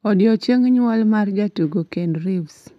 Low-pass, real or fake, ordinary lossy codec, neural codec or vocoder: 14.4 kHz; real; none; none